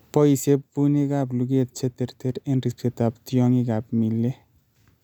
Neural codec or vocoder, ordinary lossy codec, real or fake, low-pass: none; none; real; 19.8 kHz